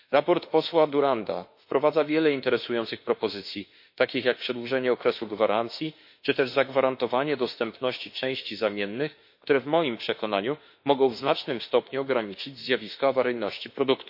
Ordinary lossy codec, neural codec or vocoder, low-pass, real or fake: MP3, 32 kbps; autoencoder, 48 kHz, 32 numbers a frame, DAC-VAE, trained on Japanese speech; 5.4 kHz; fake